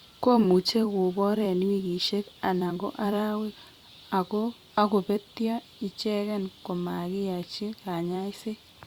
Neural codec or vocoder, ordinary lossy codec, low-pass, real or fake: vocoder, 44.1 kHz, 128 mel bands every 256 samples, BigVGAN v2; Opus, 64 kbps; 19.8 kHz; fake